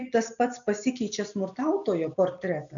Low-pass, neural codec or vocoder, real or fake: 7.2 kHz; none; real